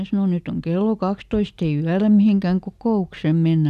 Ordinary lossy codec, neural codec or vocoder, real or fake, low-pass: none; none; real; 14.4 kHz